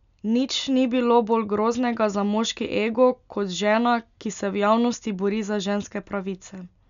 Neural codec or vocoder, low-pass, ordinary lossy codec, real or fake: none; 7.2 kHz; none; real